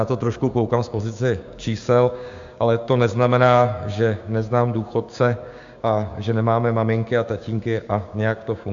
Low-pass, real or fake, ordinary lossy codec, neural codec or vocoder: 7.2 kHz; fake; AAC, 64 kbps; codec, 16 kHz, 6 kbps, DAC